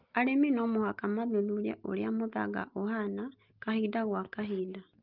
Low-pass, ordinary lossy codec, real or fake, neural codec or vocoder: 5.4 kHz; Opus, 32 kbps; real; none